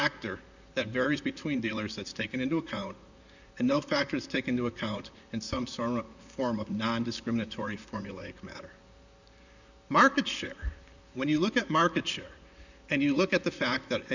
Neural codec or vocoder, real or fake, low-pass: vocoder, 44.1 kHz, 128 mel bands, Pupu-Vocoder; fake; 7.2 kHz